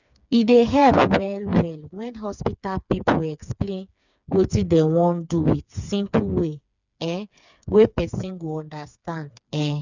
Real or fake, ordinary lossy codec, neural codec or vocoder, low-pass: fake; none; codec, 16 kHz, 4 kbps, FreqCodec, smaller model; 7.2 kHz